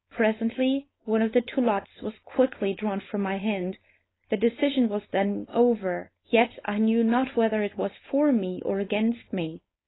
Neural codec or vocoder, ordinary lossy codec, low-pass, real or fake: codec, 16 kHz, 4.8 kbps, FACodec; AAC, 16 kbps; 7.2 kHz; fake